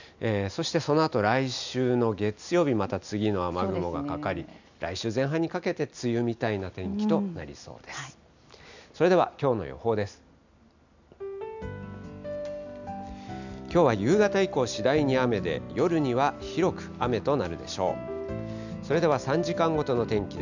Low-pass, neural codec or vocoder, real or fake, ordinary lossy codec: 7.2 kHz; none; real; none